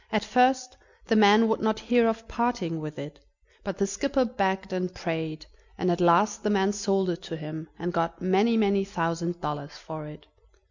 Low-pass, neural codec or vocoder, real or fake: 7.2 kHz; none; real